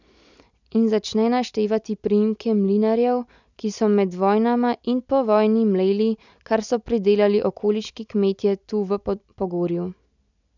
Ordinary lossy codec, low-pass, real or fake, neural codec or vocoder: none; 7.2 kHz; real; none